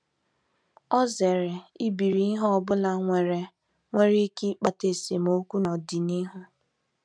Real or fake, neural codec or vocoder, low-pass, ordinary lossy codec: real; none; 9.9 kHz; none